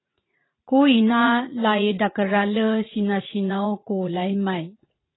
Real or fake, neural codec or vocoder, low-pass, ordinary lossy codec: fake; vocoder, 44.1 kHz, 80 mel bands, Vocos; 7.2 kHz; AAC, 16 kbps